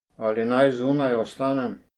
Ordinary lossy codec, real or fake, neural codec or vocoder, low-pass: Opus, 24 kbps; fake; vocoder, 44.1 kHz, 128 mel bands every 512 samples, BigVGAN v2; 19.8 kHz